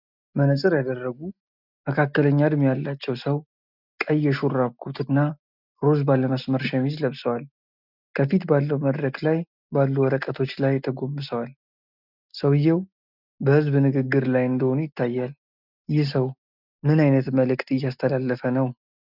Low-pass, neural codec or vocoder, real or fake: 5.4 kHz; none; real